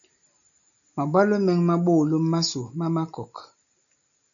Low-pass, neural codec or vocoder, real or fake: 7.2 kHz; none; real